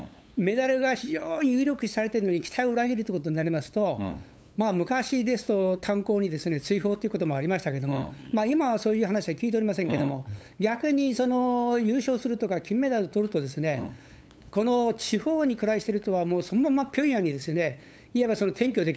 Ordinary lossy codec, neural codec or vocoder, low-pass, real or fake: none; codec, 16 kHz, 8 kbps, FunCodec, trained on LibriTTS, 25 frames a second; none; fake